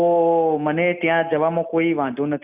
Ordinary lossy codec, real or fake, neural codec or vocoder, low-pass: none; real; none; 3.6 kHz